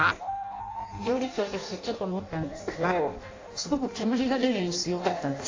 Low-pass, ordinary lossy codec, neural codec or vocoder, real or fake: 7.2 kHz; AAC, 32 kbps; codec, 16 kHz in and 24 kHz out, 0.6 kbps, FireRedTTS-2 codec; fake